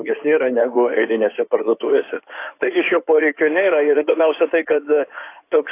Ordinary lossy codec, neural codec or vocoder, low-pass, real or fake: AAC, 24 kbps; codec, 16 kHz in and 24 kHz out, 2.2 kbps, FireRedTTS-2 codec; 3.6 kHz; fake